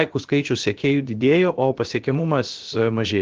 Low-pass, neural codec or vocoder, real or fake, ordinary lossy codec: 7.2 kHz; codec, 16 kHz, about 1 kbps, DyCAST, with the encoder's durations; fake; Opus, 16 kbps